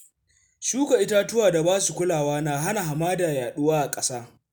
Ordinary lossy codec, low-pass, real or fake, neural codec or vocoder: none; none; real; none